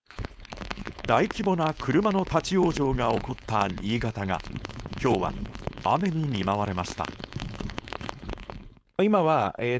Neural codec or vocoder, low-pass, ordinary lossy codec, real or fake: codec, 16 kHz, 4.8 kbps, FACodec; none; none; fake